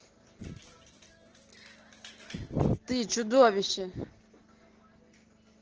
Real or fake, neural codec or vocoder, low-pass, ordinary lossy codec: real; none; 7.2 kHz; Opus, 16 kbps